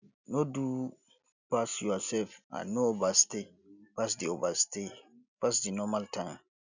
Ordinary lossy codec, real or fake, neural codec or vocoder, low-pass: none; real; none; 7.2 kHz